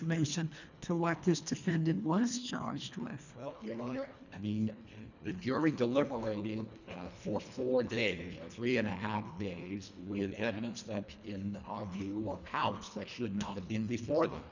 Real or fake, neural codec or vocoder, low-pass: fake; codec, 24 kHz, 1.5 kbps, HILCodec; 7.2 kHz